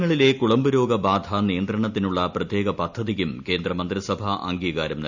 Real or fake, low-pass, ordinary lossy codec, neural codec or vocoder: real; 7.2 kHz; none; none